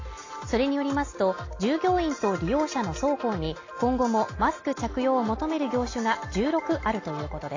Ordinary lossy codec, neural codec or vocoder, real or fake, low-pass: AAC, 32 kbps; none; real; 7.2 kHz